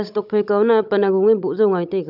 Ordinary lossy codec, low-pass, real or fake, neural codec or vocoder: none; 5.4 kHz; fake; codec, 16 kHz, 16 kbps, FunCodec, trained on Chinese and English, 50 frames a second